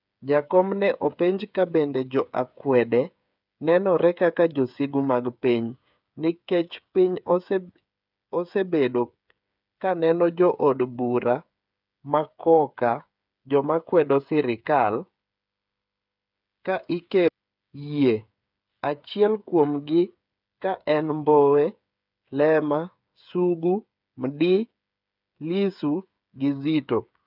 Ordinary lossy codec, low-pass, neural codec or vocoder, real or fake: none; 5.4 kHz; codec, 16 kHz, 8 kbps, FreqCodec, smaller model; fake